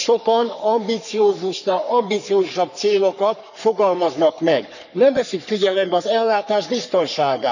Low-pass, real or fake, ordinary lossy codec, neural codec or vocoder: 7.2 kHz; fake; none; codec, 44.1 kHz, 3.4 kbps, Pupu-Codec